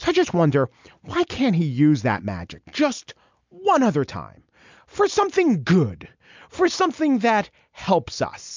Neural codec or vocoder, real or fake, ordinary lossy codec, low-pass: none; real; MP3, 64 kbps; 7.2 kHz